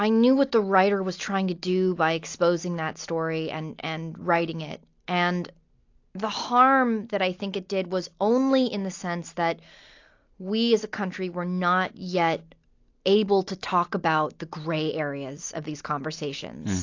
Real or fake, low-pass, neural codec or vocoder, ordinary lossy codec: real; 7.2 kHz; none; AAC, 48 kbps